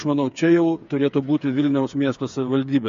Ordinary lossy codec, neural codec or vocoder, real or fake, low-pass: MP3, 48 kbps; codec, 16 kHz, 8 kbps, FreqCodec, smaller model; fake; 7.2 kHz